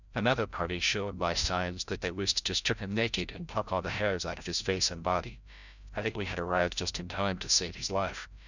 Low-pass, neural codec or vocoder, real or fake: 7.2 kHz; codec, 16 kHz, 0.5 kbps, FreqCodec, larger model; fake